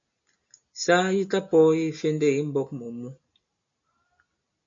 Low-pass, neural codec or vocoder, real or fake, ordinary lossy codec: 7.2 kHz; none; real; MP3, 48 kbps